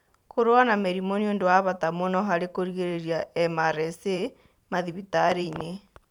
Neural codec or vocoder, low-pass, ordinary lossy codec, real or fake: vocoder, 44.1 kHz, 128 mel bands every 512 samples, BigVGAN v2; 19.8 kHz; none; fake